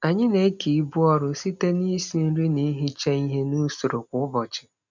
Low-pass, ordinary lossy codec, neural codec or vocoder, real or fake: 7.2 kHz; none; none; real